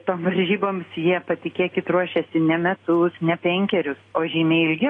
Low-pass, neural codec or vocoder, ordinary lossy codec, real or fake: 9.9 kHz; none; AAC, 32 kbps; real